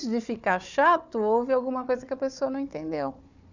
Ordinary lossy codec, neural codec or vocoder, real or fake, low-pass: none; codec, 16 kHz, 4 kbps, FunCodec, trained on Chinese and English, 50 frames a second; fake; 7.2 kHz